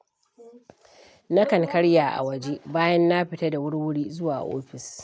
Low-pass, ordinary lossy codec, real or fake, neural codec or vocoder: none; none; real; none